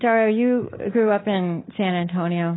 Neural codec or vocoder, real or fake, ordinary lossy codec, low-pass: codec, 24 kHz, 3.1 kbps, DualCodec; fake; AAC, 16 kbps; 7.2 kHz